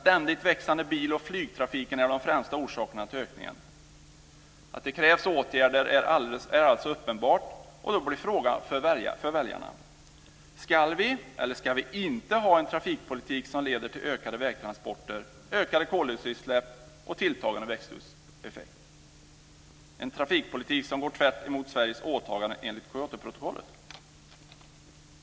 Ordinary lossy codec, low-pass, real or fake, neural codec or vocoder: none; none; real; none